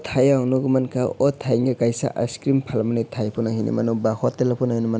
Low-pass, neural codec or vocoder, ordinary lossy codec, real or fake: none; none; none; real